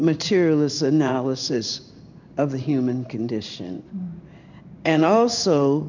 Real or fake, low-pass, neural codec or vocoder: fake; 7.2 kHz; codec, 16 kHz in and 24 kHz out, 1 kbps, XY-Tokenizer